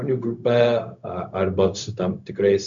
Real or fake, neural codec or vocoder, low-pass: fake; codec, 16 kHz, 0.4 kbps, LongCat-Audio-Codec; 7.2 kHz